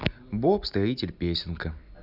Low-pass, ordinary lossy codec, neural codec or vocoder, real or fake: 5.4 kHz; none; none; real